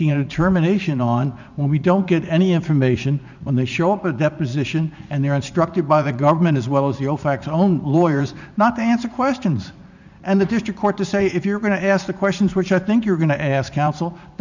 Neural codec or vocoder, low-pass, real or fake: vocoder, 44.1 kHz, 80 mel bands, Vocos; 7.2 kHz; fake